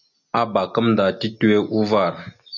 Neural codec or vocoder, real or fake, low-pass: none; real; 7.2 kHz